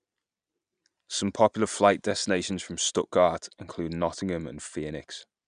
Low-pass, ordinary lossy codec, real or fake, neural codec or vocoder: 9.9 kHz; none; real; none